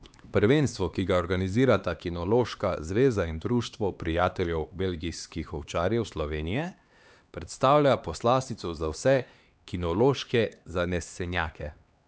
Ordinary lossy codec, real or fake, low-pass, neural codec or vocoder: none; fake; none; codec, 16 kHz, 2 kbps, X-Codec, HuBERT features, trained on LibriSpeech